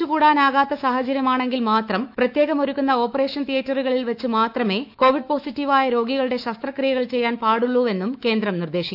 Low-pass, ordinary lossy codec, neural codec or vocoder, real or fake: 5.4 kHz; AAC, 48 kbps; autoencoder, 48 kHz, 128 numbers a frame, DAC-VAE, trained on Japanese speech; fake